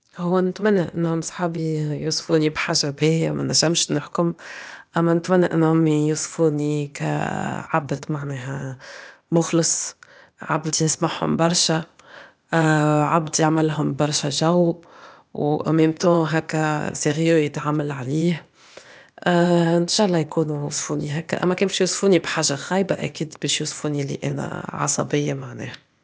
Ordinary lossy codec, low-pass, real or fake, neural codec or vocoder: none; none; fake; codec, 16 kHz, 0.8 kbps, ZipCodec